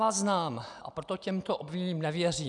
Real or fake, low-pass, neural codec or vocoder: real; 10.8 kHz; none